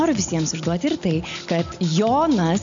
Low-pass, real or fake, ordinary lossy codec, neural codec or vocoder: 7.2 kHz; real; MP3, 64 kbps; none